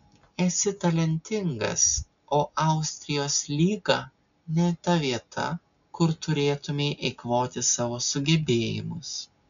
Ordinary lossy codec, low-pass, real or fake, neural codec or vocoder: MP3, 96 kbps; 7.2 kHz; real; none